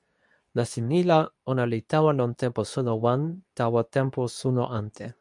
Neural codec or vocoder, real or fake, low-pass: codec, 24 kHz, 0.9 kbps, WavTokenizer, medium speech release version 2; fake; 10.8 kHz